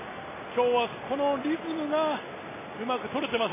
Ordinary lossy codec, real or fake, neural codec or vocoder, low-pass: MP3, 16 kbps; real; none; 3.6 kHz